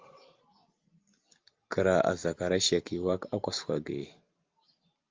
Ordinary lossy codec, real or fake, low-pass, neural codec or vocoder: Opus, 32 kbps; real; 7.2 kHz; none